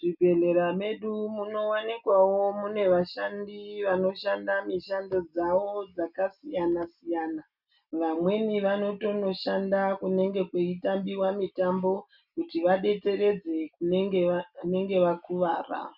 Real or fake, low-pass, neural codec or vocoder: real; 5.4 kHz; none